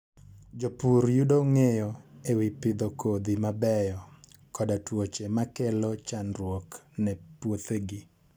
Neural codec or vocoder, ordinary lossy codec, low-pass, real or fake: none; none; none; real